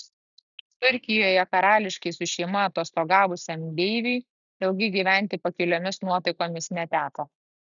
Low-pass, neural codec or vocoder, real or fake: 7.2 kHz; none; real